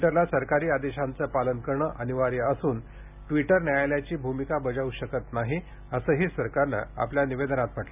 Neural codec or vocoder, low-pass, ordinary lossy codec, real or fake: none; 3.6 kHz; none; real